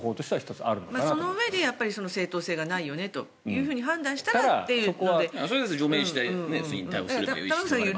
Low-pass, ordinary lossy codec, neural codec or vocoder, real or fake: none; none; none; real